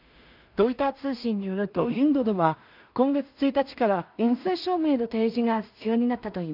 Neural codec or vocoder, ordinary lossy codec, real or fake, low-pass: codec, 16 kHz in and 24 kHz out, 0.4 kbps, LongCat-Audio-Codec, two codebook decoder; none; fake; 5.4 kHz